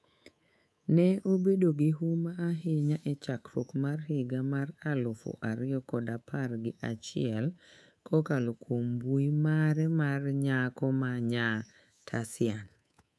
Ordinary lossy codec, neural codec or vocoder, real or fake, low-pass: none; codec, 24 kHz, 3.1 kbps, DualCodec; fake; none